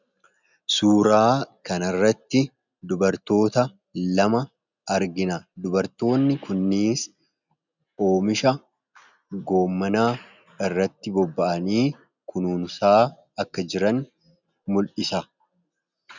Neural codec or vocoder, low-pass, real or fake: none; 7.2 kHz; real